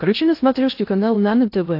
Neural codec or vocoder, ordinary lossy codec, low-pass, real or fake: codec, 16 kHz in and 24 kHz out, 0.6 kbps, FocalCodec, streaming, 2048 codes; AAC, 32 kbps; 5.4 kHz; fake